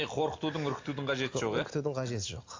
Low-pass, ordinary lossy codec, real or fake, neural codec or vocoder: 7.2 kHz; AAC, 48 kbps; real; none